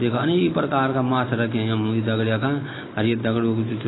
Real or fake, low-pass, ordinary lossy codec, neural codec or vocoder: real; 7.2 kHz; AAC, 16 kbps; none